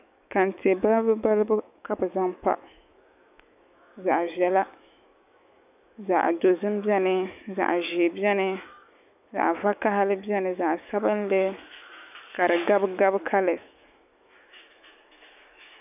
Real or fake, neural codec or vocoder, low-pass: fake; autoencoder, 48 kHz, 128 numbers a frame, DAC-VAE, trained on Japanese speech; 3.6 kHz